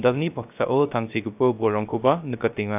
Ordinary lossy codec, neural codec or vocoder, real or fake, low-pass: AAC, 32 kbps; codec, 16 kHz, 0.3 kbps, FocalCodec; fake; 3.6 kHz